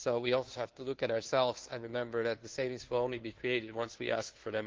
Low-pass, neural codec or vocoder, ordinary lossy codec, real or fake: 7.2 kHz; codec, 16 kHz, 2 kbps, FunCodec, trained on Chinese and English, 25 frames a second; Opus, 16 kbps; fake